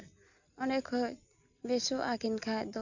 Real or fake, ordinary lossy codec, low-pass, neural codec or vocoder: real; none; 7.2 kHz; none